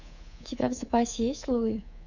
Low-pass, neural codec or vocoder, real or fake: 7.2 kHz; codec, 24 kHz, 3.1 kbps, DualCodec; fake